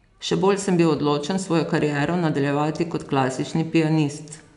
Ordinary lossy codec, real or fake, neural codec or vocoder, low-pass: none; real; none; 10.8 kHz